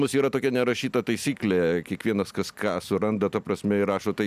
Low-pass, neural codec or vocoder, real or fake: 14.4 kHz; none; real